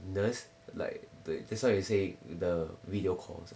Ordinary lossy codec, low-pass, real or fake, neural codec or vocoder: none; none; real; none